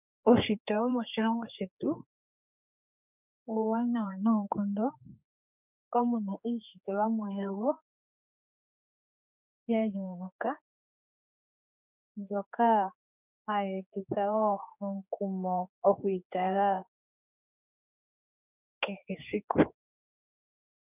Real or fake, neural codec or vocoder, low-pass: fake; codec, 16 kHz, 4 kbps, X-Codec, HuBERT features, trained on general audio; 3.6 kHz